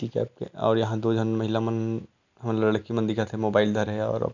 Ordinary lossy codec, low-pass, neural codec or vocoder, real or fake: none; 7.2 kHz; none; real